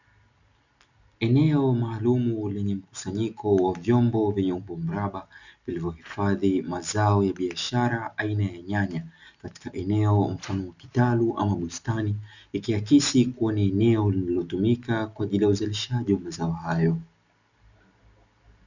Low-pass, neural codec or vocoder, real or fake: 7.2 kHz; none; real